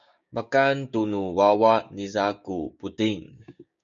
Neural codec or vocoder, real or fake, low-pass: codec, 16 kHz, 6 kbps, DAC; fake; 7.2 kHz